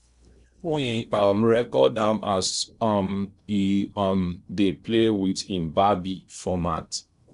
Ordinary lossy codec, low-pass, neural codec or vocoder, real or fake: none; 10.8 kHz; codec, 16 kHz in and 24 kHz out, 0.8 kbps, FocalCodec, streaming, 65536 codes; fake